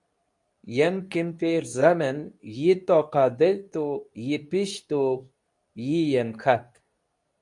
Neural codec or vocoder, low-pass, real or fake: codec, 24 kHz, 0.9 kbps, WavTokenizer, medium speech release version 2; 10.8 kHz; fake